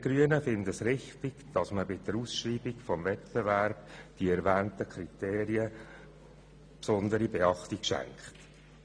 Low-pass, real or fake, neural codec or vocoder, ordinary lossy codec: 9.9 kHz; real; none; none